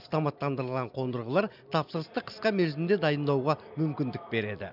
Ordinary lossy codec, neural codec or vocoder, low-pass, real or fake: none; none; 5.4 kHz; real